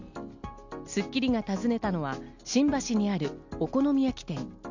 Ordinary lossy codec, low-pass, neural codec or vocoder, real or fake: none; 7.2 kHz; none; real